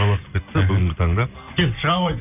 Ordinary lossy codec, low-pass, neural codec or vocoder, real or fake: none; 3.6 kHz; none; real